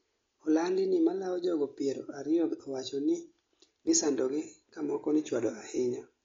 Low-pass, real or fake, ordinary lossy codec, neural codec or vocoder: 7.2 kHz; real; AAC, 32 kbps; none